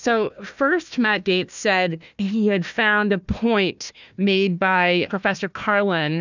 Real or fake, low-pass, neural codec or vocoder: fake; 7.2 kHz; codec, 16 kHz, 1 kbps, FunCodec, trained on Chinese and English, 50 frames a second